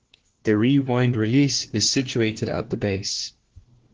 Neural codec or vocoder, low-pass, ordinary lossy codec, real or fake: codec, 16 kHz, 1 kbps, FunCodec, trained on LibriTTS, 50 frames a second; 7.2 kHz; Opus, 16 kbps; fake